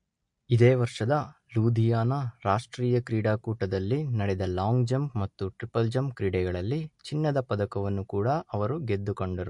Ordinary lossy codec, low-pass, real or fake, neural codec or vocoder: MP3, 48 kbps; 10.8 kHz; real; none